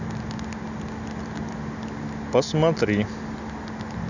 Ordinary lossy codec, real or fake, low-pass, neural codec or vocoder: none; real; 7.2 kHz; none